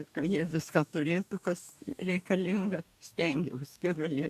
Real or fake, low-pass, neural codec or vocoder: fake; 14.4 kHz; codec, 44.1 kHz, 2.6 kbps, DAC